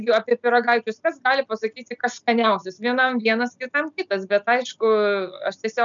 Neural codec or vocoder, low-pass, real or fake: none; 7.2 kHz; real